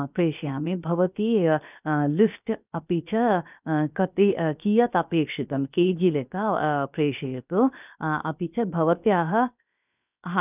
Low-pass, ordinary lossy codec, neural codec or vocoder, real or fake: 3.6 kHz; none; codec, 16 kHz, 0.7 kbps, FocalCodec; fake